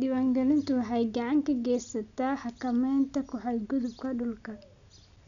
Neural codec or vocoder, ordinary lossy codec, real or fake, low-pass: none; none; real; 7.2 kHz